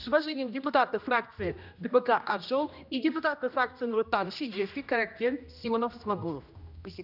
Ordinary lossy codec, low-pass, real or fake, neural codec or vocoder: none; 5.4 kHz; fake; codec, 16 kHz, 1 kbps, X-Codec, HuBERT features, trained on general audio